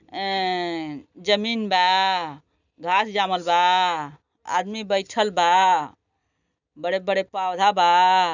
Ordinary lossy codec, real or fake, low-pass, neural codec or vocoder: none; real; 7.2 kHz; none